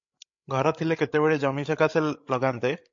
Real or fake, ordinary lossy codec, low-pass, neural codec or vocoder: fake; MP3, 48 kbps; 7.2 kHz; codec, 16 kHz, 16 kbps, FreqCodec, larger model